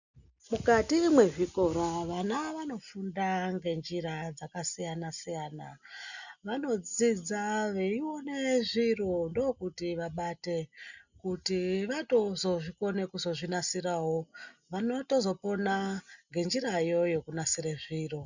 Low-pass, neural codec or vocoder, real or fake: 7.2 kHz; none; real